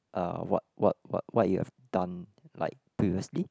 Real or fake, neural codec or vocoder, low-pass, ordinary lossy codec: real; none; none; none